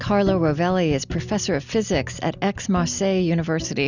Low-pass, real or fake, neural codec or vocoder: 7.2 kHz; real; none